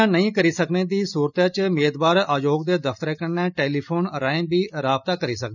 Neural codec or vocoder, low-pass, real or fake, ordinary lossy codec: none; 7.2 kHz; real; none